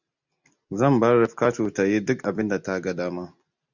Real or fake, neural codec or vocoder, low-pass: real; none; 7.2 kHz